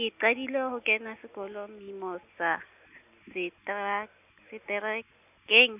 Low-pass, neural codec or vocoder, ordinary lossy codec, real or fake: 3.6 kHz; none; none; real